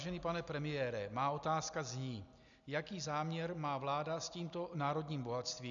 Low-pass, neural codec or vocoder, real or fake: 7.2 kHz; none; real